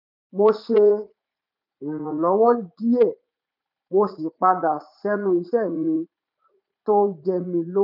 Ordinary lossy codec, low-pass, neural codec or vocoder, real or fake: none; 5.4 kHz; vocoder, 44.1 kHz, 80 mel bands, Vocos; fake